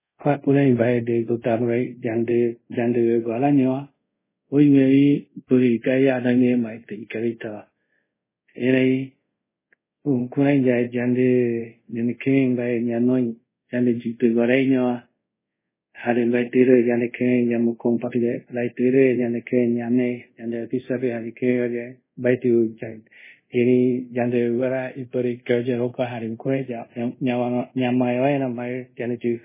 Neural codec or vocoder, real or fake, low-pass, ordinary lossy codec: codec, 24 kHz, 0.5 kbps, DualCodec; fake; 3.6 kHz; MP3, 16 kbps